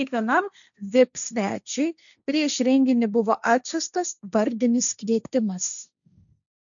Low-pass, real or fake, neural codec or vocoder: 7.2 kHz; fake; codec, 16 kHz, 1.1 kbps, Voila-Tokenizer